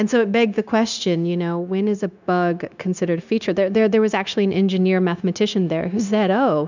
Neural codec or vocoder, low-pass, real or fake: codec, 16 kHz, 0.9 kbps, LongCat-Audio-Codec; 7.2 kHz; fake